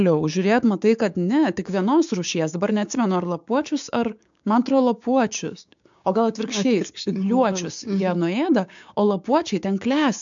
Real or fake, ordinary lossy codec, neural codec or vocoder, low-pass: fake; MP3, 64 kbps; codec, 16 kHz, 6 kbps, DAC; 7.2 kHz